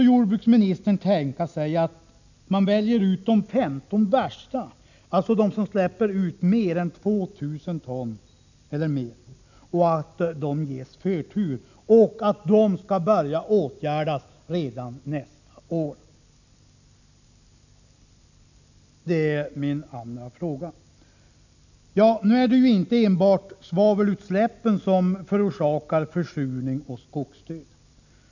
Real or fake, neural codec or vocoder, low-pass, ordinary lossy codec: real; none; 7.2 kHz; none